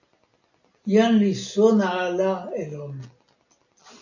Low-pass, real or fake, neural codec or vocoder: 7.2 kHz; real; none